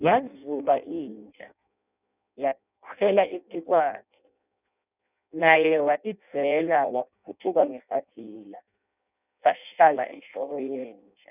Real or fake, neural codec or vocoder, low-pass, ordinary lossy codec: fake; codec, 16 kHz in and 24 kHz out, 0.6 kbps, FireRedTTS-2 codec; 3.6 kHz; none